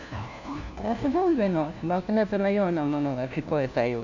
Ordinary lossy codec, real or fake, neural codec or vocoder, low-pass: none; fake; codec, 16 kHz, 1 kbps, FunCodec, trained on LibriTTS, 50 frames a second; 7.2 kHz